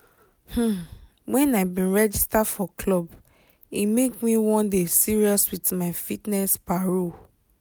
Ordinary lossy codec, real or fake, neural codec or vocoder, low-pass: none; real; none; none